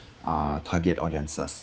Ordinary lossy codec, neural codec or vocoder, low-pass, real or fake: none; codec, 16 kHz, 4 kbps, X-Codec, HuBERT features, trained on general audio; none; fake